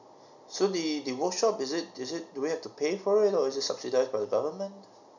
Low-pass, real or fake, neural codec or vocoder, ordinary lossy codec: 7.2 kHz; real; none; none